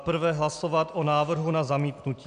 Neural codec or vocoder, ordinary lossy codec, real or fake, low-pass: none; MP3, 96 kbps; real; 9.9 kHz